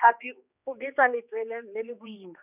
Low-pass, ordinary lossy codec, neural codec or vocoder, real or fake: 3.6 kHz; none; codec, 16 kHz, 2 kbps, X-Codec, HuBERT features, trained on general audio; fake